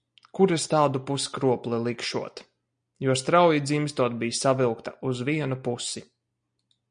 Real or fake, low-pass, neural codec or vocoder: real; 9.9 kHz; none